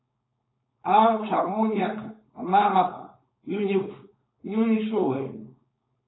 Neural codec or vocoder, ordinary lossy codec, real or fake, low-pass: codec, 16 kHz, 4.8 kbps, FACodec; AAC, 16 kbps; fake; 7.2 kHz